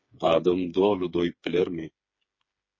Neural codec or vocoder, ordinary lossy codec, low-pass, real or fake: codec, 16 kHz, 4 kbps, FreqCodec, smaller model; MP3, 32 kbps; 7.2 kHz; fake